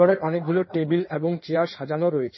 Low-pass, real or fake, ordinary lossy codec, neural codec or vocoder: 7.2 kHz; fake; MP3, 24 kbps; codec, 16 kHz in and 24 kHz out, 1.1 kbps, FireRedTTS-2 codec